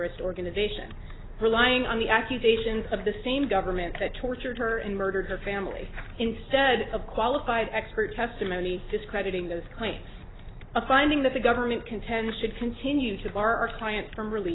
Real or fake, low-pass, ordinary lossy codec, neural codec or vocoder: real; 7.2 kHz; AAC, 16 kbps; none